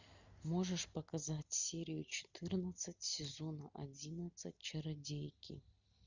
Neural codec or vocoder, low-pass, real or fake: none; 7.2 kHz; real